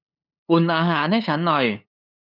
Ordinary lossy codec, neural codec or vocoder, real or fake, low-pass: none; codec, 16 kHz, 8 kbps, FunCodec, trained on LibriTTS, 25 frames a second; fake; 5.4 kHz